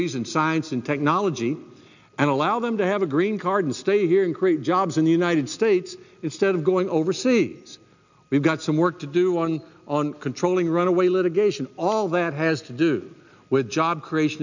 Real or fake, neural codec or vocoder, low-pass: real; none; 7.2 kHz